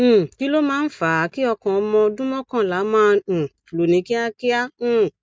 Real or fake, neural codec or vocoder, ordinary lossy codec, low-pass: real; none; none; none